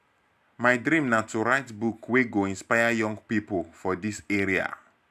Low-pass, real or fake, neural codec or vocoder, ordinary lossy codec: 14.4 kHz; real; none; AAC, 96 kbps